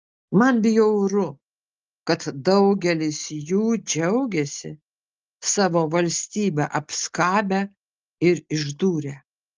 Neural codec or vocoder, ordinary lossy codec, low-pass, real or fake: none; Opus, 24 kbps; 7.2 kHz; real